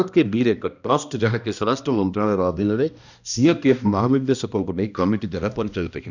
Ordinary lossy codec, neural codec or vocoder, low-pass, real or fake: none; codec, 16 kHz, 1 kbps, X-Codec, HuBERT features, trained on balanced general audio; 7.2 kHz; fake